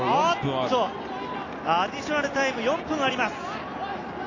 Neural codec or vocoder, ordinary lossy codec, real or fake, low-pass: none; AAC, 32 kbps; real; 7.2 kHz